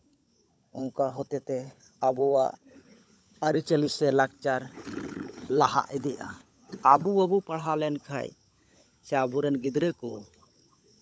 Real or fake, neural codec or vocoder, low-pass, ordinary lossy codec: fake; codec, 16 kHz, 16 kbps, FunCodec, trained on LibriTTS, 50 frames a second; none; none